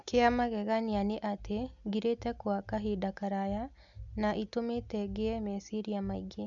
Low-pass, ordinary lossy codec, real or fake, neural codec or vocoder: 7.2 kHz; none; real; none